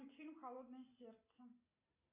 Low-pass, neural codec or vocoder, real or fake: 3.6 kHz; none; real